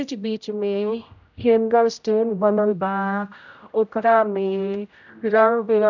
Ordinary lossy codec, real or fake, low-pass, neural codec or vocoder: none; fake; 7.2 kHz; codec, 16 kHz, 0.5 kbps, X-Codec, HuBERT features, trained on general audio